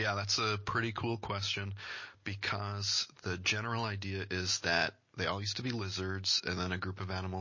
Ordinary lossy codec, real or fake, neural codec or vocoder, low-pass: MP3, 32 kbps; real; none; 7.2 kHz